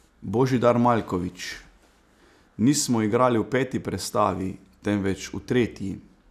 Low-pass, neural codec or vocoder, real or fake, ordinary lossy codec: 14.4 kHz; none; real; none